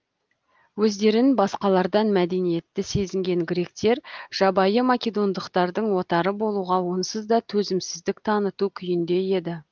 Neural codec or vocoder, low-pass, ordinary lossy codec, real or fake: none; 7.2 kHz; Opus, 32 kbps; real